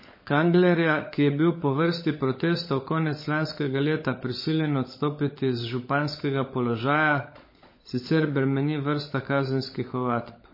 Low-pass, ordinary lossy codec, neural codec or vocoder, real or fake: 5.4 kHz; MP3, 24 kbps; codec, 16 kHz, 16 kbps, FunCodec, trained on LibriTTS, 50 frames a second; fake